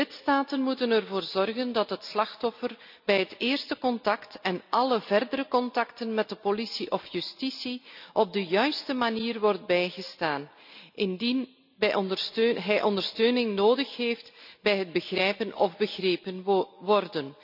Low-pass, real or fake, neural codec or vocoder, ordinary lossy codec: 5.4 kHz; real; none; none